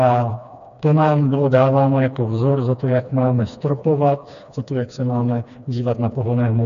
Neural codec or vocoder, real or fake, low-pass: codec, 16 kHz, 2 kbps, FreqCodec, smaller model; fake; 7.2 kHz